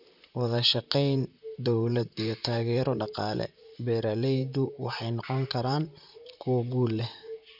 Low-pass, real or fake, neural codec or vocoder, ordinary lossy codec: 5.4 kHz; fake; vocoder, 44.1 kHz, 128 mel bands, Pupu-Vocoder; none